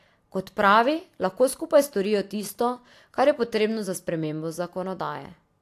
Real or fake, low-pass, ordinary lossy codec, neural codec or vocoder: real; 14.4 kHz; AAC, 64 kbps; none